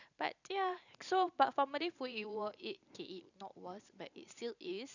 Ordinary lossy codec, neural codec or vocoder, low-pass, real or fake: none; vocoder, 44.1 kHz, 128 mel bands every 512 samples, BigVGAN v2; 7.2 kHz; fake